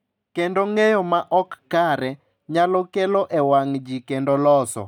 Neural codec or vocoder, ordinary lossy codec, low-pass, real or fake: none; none; 19.8 kHz; real